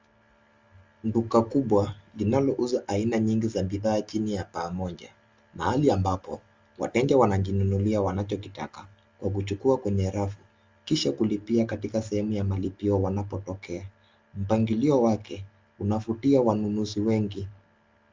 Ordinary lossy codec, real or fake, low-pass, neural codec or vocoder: Opus, 32 kbps; real; 7.2 kHz; none